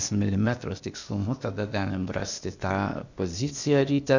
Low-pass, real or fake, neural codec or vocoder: 7.2 kHz; fake; codec, 16 kHz, 0.8 kbps, ZipCodec